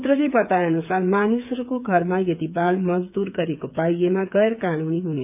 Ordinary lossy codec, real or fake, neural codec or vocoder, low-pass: none; fake; codec, 16 kHz, 8 kbps, FreqCodec, smaller model; 3.6 kHz